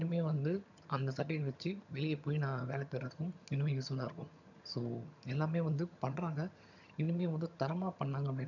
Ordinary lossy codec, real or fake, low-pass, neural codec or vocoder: none; fake; 7.2 kHz; vocoder, 22.05 kHz, 80 mel bands, HiFi-GAN